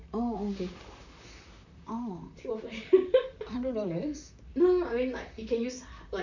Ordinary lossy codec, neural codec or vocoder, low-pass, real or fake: none; vocoder, 44.1 kHz, 128 mel bands, Pupu-Vocoder; 7.2 kHz; fake